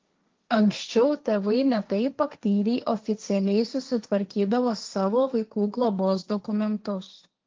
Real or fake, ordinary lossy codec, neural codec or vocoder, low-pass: fake; Opus, 32 kbps; codec, 16 kHz, 1.1 kbps, Voila-Tokenizer; 7.2 kHz